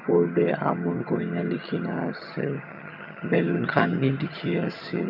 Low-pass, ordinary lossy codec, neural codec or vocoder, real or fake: 5.4 kHz; none; vocoder, 22.05 kHz, 80 mel bands, HiFi-GAN; fake